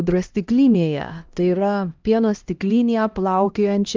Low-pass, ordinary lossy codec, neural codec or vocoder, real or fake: 7.2 kHz; Opus, 24 kbps; codec, 16 kHz, 1 kbps, X-Codec, HuBERT features, trained on LibriSpeech; fake